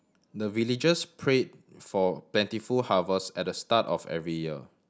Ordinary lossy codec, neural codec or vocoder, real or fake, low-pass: none; none; real; none